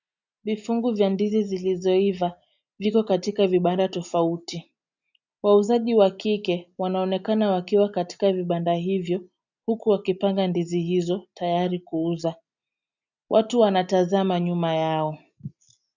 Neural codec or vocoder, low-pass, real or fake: none; 7.2 kHz; real